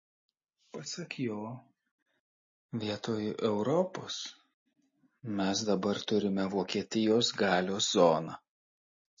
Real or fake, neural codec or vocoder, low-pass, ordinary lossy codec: real; none; 7.2 kHz; MP3, 32 kbps